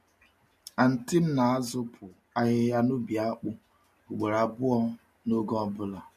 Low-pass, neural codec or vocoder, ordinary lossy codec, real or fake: 14.4 kHz; none; MP3, 64 kbps; real